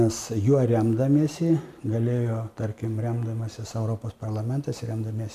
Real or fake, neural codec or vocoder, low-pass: real; none; 14.4 kHz